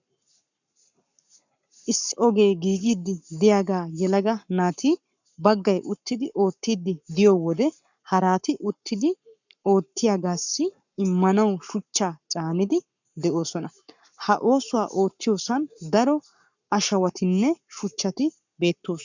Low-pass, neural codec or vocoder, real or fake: 7.2 kHz; codec, 44.1 kHz, 7.8 kbps, Pupu-Codec; fake